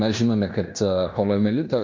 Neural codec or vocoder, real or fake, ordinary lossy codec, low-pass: codec, 16 kHz in and 24 kHz out, 0.9 kbps, LongCat-Audio-Codec, fine tuned four codebook decoder; fake; MP3, 48 kbps; 7.2 kHz